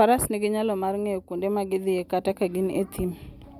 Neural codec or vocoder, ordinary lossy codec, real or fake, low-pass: none; none; real; 19.8 kHz